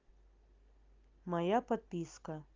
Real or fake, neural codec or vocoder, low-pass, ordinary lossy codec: real; none; 7.2 kHz; Opus, 32 kbps